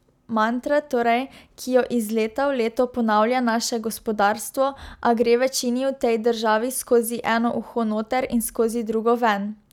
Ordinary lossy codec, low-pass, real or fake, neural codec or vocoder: none; 19.8 kHz; real; none